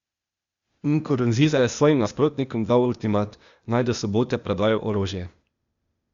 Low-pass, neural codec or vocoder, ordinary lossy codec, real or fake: 7.2 kHz; codec, 16 kHz, 0.8 kbps, ZipCodec; Opus, 64 kbps; fake